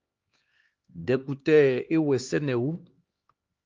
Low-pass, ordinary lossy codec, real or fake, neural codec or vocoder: 7.2 kHz; Opus, 24 kbps; fake; codec, 16 kHz, 2 kbps, X-Codec, HuBERT features, trained on LibriSpeech